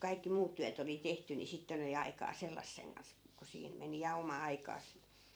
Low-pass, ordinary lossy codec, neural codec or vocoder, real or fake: none; none; vocoder, 44.1 kHz, 128 mel bands every 256 samples, BigVGAN v2; fake